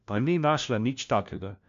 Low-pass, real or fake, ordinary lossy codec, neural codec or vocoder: 7.2 kHz; fake; AAC, 64 kbps; codec, 16 kHz, 1 kbps, FunCodec, trained on LibriTTS, 50 frames a second